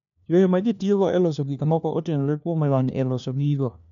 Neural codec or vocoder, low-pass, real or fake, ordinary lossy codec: codec, 16 kHz, 1 kbps, FunCodec, trained on LibriTTS, 50 frames a second; 7.2 kHz; fake; none